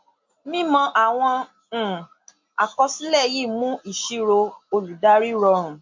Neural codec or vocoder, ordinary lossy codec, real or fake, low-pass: none; MP3, 48 kbps; real; 7.2 kHz